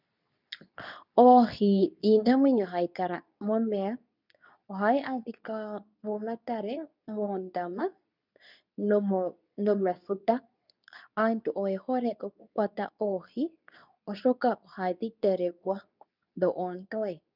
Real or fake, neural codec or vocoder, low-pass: fake; codec, 24 kHz, 0.9 kbps, WavTokenizer, medium speech release version 2; 5.4 kHz